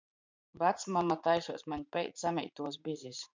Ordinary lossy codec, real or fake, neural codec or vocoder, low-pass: MP3, 64 kbps; fake; vocoder, 44.1 kHz, 80 mel bands, Vocos; 7.2 kHz